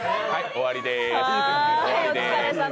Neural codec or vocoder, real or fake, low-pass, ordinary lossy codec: none; real; none; none